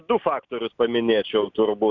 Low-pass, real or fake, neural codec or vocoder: 7.2 kHz; real; none